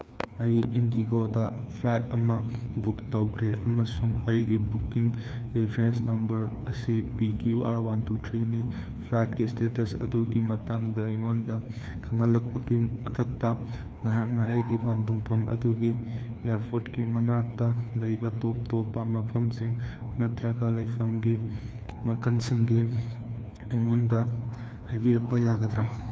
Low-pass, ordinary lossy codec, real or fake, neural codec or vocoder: none; none; fake; codec, 16 kHz, 2 kbps, FreqCodec, larger model